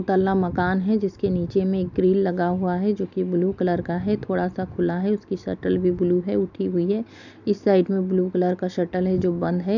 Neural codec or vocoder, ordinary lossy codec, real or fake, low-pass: none; none; real; 7.2 kHz